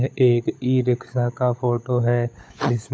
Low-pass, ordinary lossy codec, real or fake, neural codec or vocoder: none; none; fake; codec, 16 kHz, 16 kbps, FunCodec, trained on LibriTTS, 50 frames a second